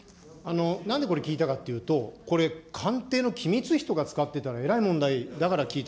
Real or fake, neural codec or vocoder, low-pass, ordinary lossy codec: real; none; none; none